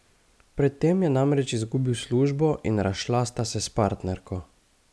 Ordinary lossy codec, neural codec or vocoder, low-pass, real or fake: none; none; none; real